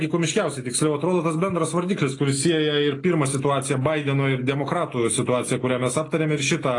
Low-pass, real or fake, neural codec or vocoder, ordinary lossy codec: 10.8 kHz; real; none; AAC, 32 kbps